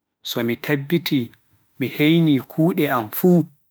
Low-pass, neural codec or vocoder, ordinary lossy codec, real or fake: none; autoencoder, 48 kHz, 32 numbers a frame, DAC-VAE, trained on Japanese speech; none; fake